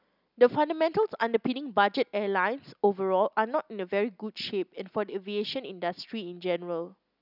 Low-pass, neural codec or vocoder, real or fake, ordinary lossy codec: 5.4 kHz; none; real; none